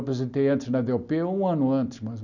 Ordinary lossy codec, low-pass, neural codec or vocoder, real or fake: none; 7.2 kHz; none; real